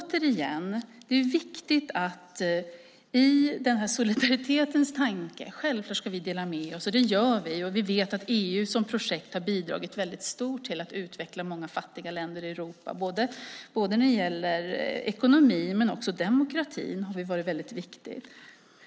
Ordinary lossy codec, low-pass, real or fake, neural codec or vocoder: none; none; real; none